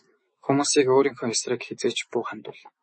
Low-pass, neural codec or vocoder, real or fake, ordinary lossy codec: 10.8 kHz; autoencoder, 48 kHz, 128 numbers a frame, DAC-VAE, trained on Japanese speech; fake; MP3, 32 kbps